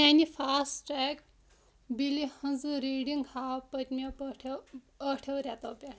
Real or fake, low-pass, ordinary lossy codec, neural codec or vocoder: real; none; none; none